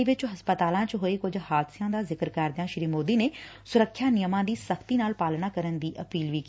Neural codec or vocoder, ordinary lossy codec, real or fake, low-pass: none; none; real; none